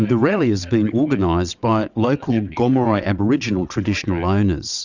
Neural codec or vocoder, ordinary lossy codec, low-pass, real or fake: vocoder, 22.05 kHz, 80 mel bands, WaveNeXt; Opus, 64 kbps; 7.2 kHz; fake